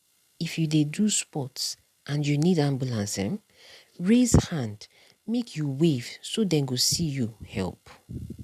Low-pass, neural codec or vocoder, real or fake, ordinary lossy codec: 14.4 kHz; none; real; none